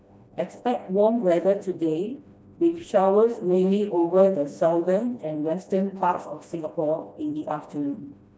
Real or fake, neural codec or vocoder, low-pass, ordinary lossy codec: fake; codec, 16 kHz, 1 kbps, FreqCodec, smaller model; none; none